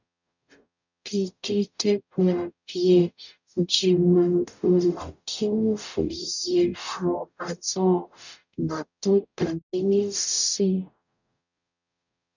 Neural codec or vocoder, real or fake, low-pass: codec, 44.1 kHz, 0.9 kbps, DAC; fake; 7.2 kHz